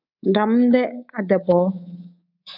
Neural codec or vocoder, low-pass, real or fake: codec, 24 kHz, 3.1 kbps, DualCodec; 5.4 kHz; fake